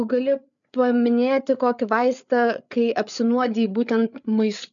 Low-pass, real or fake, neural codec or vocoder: 7.2 kHz; fake; codec, 16 kHz, 8 kbps, FreqCodec, larger model